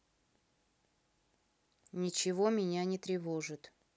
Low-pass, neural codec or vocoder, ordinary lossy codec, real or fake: none; none; none; real